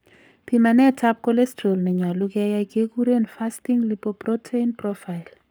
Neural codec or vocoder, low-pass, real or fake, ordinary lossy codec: codec, 44.1 kHz, 7.8 kbps, Pupu-Codec; none; fake; none